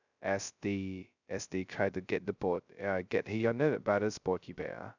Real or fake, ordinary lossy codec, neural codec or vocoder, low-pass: fake; none; codec, 16 kHz, 0.2 kbps, FocalCodec; 7.2 kHz